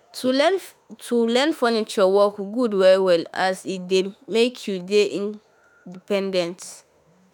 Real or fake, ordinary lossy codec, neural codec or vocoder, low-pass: fake; none; autoencoder, 48 kHz, 32 numbers a frame, DAC-VAE, trained on Japanese speech; none